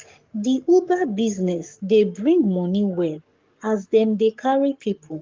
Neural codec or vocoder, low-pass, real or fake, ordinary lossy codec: codec, 44.1 kHz, 7.8 kbps, Pupu-Codec; 7.2 kHz; fake; Opus, 32 kbps